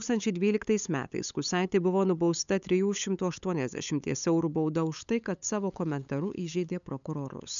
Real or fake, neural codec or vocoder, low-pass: fake; codec, 16 kHz, 8 kbps, FunCodec, trained on LibriTTS, 25 frames a second; 7.2 kHz